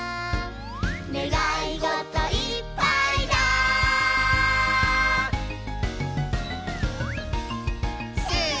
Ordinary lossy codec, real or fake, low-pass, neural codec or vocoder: none; real; none; none